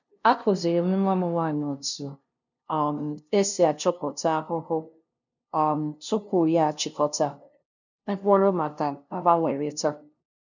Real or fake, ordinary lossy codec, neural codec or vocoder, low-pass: fake; none; codec, 16 kHz, 0.5 kbps, FunCodec, trained on LibriTTS, 25 frames a second; 7.2 kHz